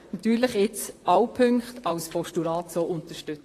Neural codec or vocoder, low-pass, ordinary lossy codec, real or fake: vocoder, 44.1 kHz, 128 mel bands, Pupu-Vocoder; 14.4 kHz; AAC, 48 kbps; fake